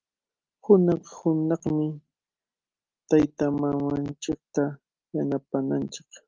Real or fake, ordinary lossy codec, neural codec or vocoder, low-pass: real; Opus, 24 kbps; none; 7.2 kHz